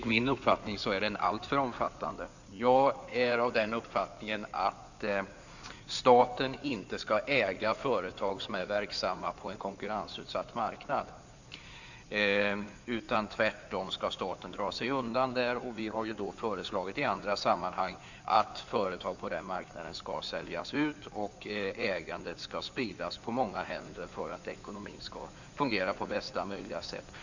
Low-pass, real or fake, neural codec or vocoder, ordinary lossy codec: 7.2 kHz; fake; codec, 16 kHz in and 24 kHz out, 2.2 kbps, FireRedTTS-2 codec; none